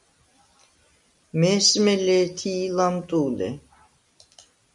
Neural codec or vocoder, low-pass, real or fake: none; 10.8 kHz; real